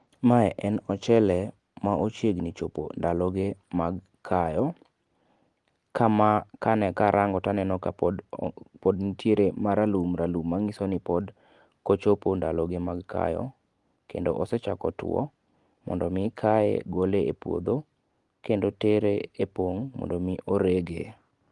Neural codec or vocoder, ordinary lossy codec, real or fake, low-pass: none; Opus, 24 kbps; real; 10.8 kHz